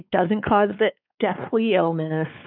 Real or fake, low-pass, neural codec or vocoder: fake; 5.4 kHz; codec, 16 kHz, 4 kbps, X-Codec, WavLM features, trained on Multilingual LibriSpeech